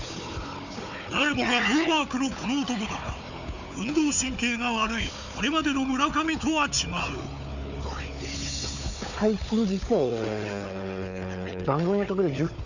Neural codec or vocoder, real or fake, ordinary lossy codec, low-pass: codec, 16 kHz, 4 kbps, FunCodec, trained on Chinese and English, 50 frames a second; fake; none; 7.2 kHz